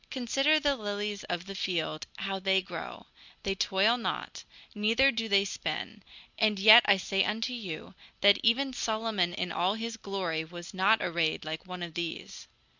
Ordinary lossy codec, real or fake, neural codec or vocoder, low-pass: Opus, 64 kbps; real; none; 7.2 kHz